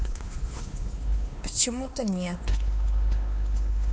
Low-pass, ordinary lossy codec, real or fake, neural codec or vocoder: none; none; fake; codec, 16 kHz, 2 kbps, X-Codec, WavLM features, trained on Multilingual LibriSpeech